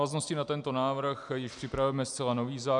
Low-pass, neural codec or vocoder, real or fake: 10.8 kHz; none; real